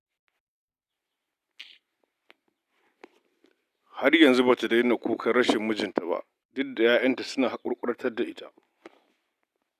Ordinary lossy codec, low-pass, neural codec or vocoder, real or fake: none; 14.4 kHz; none; real